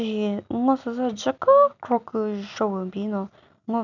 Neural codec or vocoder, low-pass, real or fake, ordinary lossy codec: none; 7.2 kHz; real; none